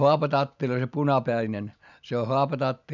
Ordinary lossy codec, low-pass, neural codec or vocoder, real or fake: none; 7.2 kHz; none; real